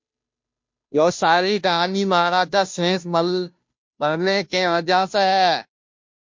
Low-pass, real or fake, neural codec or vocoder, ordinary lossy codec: 7.2 kHz; fake; codec, 16 kHz, 0.5 kbps, FunCodec, trained on Chinese and English, 25 frames a second; MP3, 48 kbps